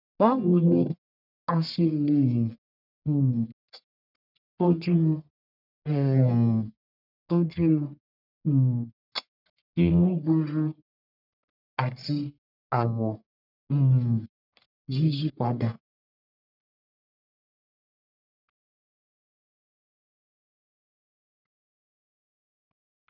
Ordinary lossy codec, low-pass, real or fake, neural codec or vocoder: none; 5.4 kHz; fake; codec, 44.1 kHz, 1.7 kbps, Pupu-Codec